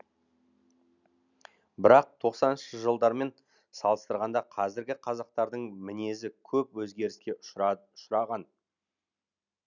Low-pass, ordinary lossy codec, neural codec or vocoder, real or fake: 7.2 kHz; none; none; real